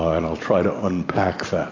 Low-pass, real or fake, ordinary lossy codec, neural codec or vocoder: 7.2 kHz; real; MP3, 48 kbps; none